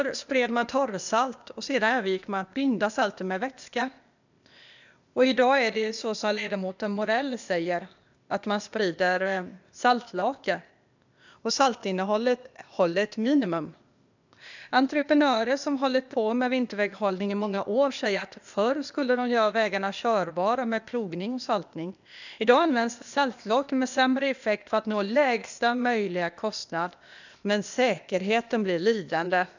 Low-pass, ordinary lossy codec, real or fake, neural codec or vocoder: 7.2 kHz; none; fake; codec, 16 kHz, 0.8 kbps, ZipCodec